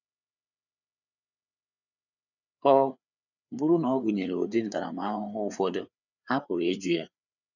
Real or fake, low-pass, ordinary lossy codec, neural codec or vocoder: fake; 7.2 kHz; none; codec, 16 kHz, 4 kbps, FreqCodec, larger model